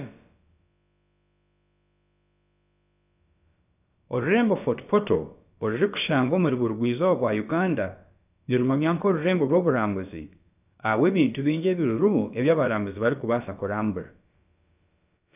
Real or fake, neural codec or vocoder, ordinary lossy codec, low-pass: fake; codec, 16 kHz, about 1 kbps, DyCAST, with the encoder's durations; none; 3.6 kHz